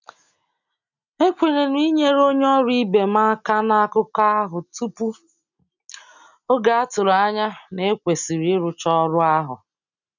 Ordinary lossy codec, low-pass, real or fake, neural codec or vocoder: none; 7.2 kHz; real; none